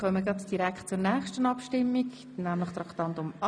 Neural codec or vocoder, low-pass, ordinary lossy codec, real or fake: none; 9.9 kHz; none; real